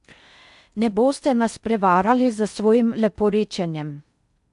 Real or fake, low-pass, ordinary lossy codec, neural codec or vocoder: fake; 10.8 kHz; none; codec, 16 kHz in and 24 kHz out, 0.6 kbps, FocalCodec, streaming, 4096 codes